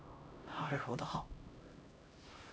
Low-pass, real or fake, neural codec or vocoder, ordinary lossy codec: none; fake; codec, 16 kHz, 0.5 kbps, X-Codec, HuBERT features, trained on LibriSpeech; none